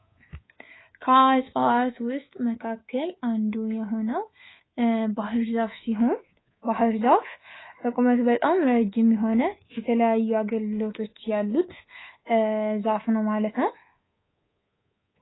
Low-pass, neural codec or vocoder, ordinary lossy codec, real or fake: 7.2 kHz; codec, 24 kHz, 3.1 kbps, DualCodec; AAC, 16 kbps; fake